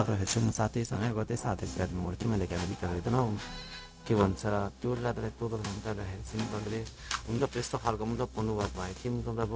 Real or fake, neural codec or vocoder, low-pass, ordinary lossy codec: fake; codec, 16 kHz, 0.4 kbps, LongCat-Audio-Codec; none; none